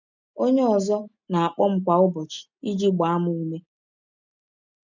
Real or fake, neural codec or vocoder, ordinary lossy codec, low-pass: real; none; none; 7.2 kHz